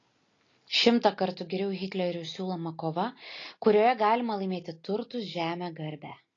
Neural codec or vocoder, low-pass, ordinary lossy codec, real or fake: none; 7.2 kHz; AAC, 32 kbps; real